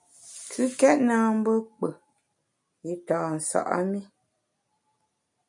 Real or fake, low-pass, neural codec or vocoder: real; 10.8 kHz; none